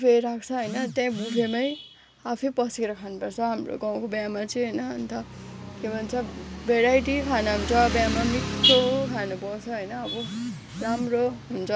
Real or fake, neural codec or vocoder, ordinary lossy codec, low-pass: real; none; none; none